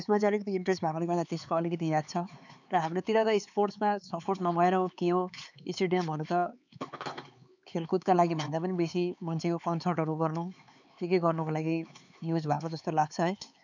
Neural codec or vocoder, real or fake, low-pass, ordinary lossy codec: codec, 16 kHz, 4 kbps, X-Codec, HuBERT features, trained on LibriSpeech; fake; 7.2 kHz; none